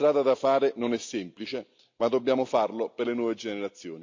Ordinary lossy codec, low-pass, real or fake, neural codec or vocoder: MP3, 64 kbps; 7.2 kHz; real; none